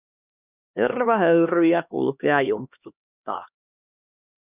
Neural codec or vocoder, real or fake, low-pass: codec, 16 kHz, 2 kbps, X-Codec, HuBERT features, trained on LibriSpeech; fake; 3.6 kHz